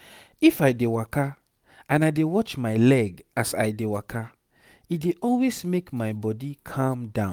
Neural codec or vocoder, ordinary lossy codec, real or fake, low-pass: none; none; real; 19.8 kHz